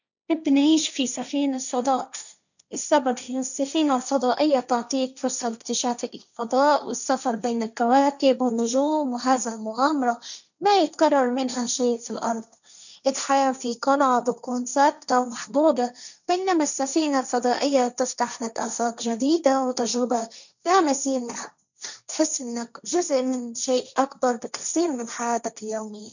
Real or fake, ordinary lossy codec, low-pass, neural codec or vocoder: fake; none; 7.2 kHz; codec, 16 kHz, 1.1 kbps, Voila-Tokenizer